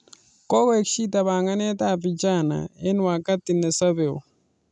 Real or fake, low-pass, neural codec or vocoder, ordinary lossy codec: real; 10.8 kHz; none; none